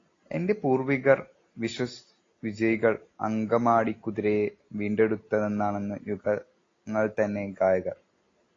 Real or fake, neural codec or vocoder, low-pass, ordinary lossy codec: real; none; 7.2 kHz; AAC, 32 kbps